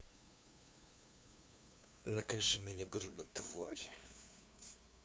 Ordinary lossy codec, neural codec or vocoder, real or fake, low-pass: none; codec, 16 kHz, 2 kbps, FunCodec, trained on LibriTTS, 25 frames a second; fake; none